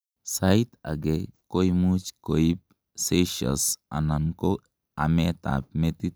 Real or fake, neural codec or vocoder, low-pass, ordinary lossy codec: real; none; none; none